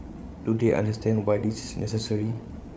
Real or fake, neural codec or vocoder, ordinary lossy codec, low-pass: fake; codec, 16 kHz, 8 kbps, FreqCodec, larger model; none; none